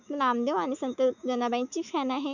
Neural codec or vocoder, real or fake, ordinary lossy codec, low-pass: autoencoder, 48 kHz, 128 numbers a frame, DAC-VAE, trained on Japanese speech; fake; none; 7.2 kHz